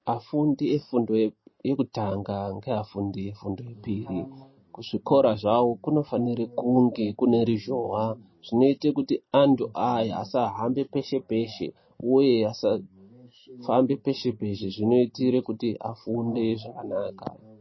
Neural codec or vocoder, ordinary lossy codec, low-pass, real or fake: none; MP3, 24 kbps; 7.2 kHz; real